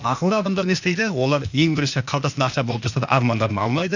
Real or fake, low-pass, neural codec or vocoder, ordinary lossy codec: fake; 7.2 kHz; codec, 16 kHz, 0.8 kbps, ZipCodec; none